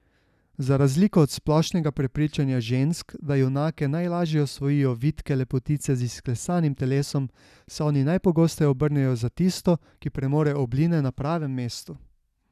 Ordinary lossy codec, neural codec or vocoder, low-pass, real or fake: none; none; 14.4 kHz; real